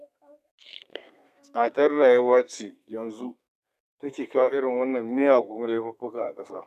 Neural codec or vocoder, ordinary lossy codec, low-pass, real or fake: codec, 32 kHz, 1.9 kbps, SNAC; none; 14.4 kHz; fake